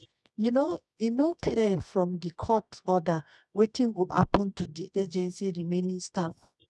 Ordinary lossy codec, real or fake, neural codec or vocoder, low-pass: none; fake; codec, 24 kHz, 0.9 kbps, WavTokenizer, medium music audio release; none